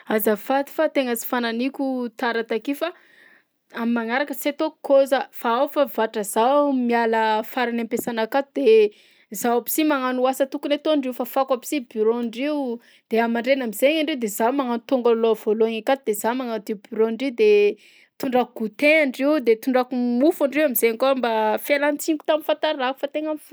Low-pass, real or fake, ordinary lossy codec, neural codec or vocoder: none; real; none; none